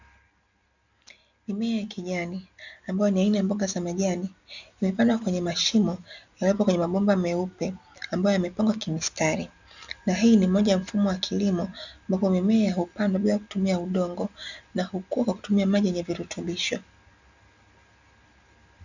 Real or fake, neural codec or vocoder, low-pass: real; none; 7.2 kHz